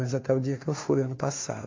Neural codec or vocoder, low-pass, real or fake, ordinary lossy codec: codec, 16 kHz, 2 kbps, FunCodec, trained on Chinese and English, 25 frames a second; 7.2 kHz; fake; AAC, 32 kbps